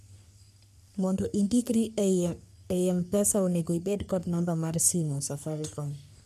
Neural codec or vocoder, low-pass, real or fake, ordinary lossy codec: codec, 44.1 kHz, 3.4 kbps, Pupu-Codec; 14.4 kHz; fake; none